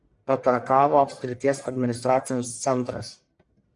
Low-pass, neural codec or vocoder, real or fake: 10.8 kHz; codec, 44.1 kHz, 1.7 kbps, Pupu-Codec; fake